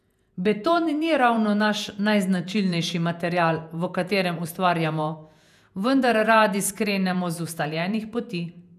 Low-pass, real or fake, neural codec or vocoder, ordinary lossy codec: 14.4 kHz; fake; vocoder, 48 kHz, 128 mel bands, Vocos; none